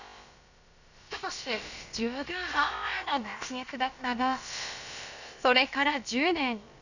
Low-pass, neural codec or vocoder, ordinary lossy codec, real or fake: 7.2 kHz; codec, 16 kHz, about 1 kbps, DyCAST, with the encoder's durations; none; fake